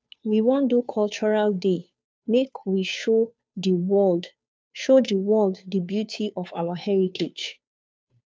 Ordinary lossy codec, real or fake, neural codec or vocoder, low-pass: none; fake; codec, 16 kHz, 2 kbps, FunCodec, trained on Chinese and English, 25 frames a second; none